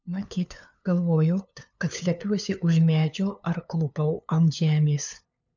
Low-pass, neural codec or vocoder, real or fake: 7.2 kHz; codec, 16 kHz, 2 kbps, FunCodec, trained on LibriTTS, 25 frames a second; fake